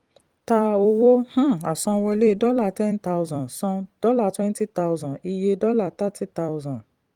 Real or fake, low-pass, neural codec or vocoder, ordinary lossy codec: fake; 19.8 kHz; vocoder, 44.1 kHz, 128 mel bands every 256 samples, BigVGAN v2; Opus, 24 kbps